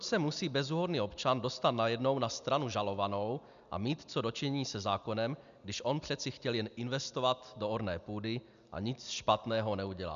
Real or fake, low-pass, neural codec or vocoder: real; 7.2 kHz; none